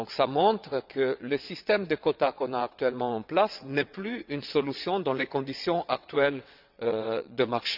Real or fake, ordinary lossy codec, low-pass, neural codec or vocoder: fake; none; 5.4 kHz; vocoder, 22.05 kHz, 80 mel bands, WaveNeXt